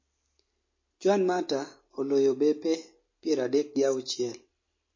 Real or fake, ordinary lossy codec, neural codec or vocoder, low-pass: real; MP3, 32 kbps; none; 7.2 kHz